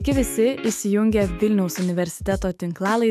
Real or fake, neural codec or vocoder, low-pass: fake; autoencoder, 48 kHz, 128 numbers a frame, DAC-VAE, trained on Japanese speech; 14.4 kHz